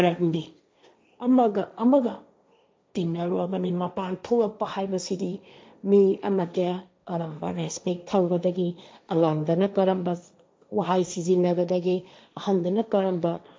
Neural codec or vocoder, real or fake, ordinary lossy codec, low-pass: codec, 16 kHz, 1.1 kbps, Voila-Tokenizer; fake; none; none